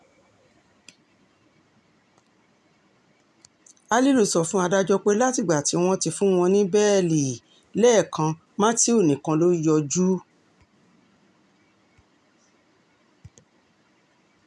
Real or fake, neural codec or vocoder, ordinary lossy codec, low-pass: real; none; none; none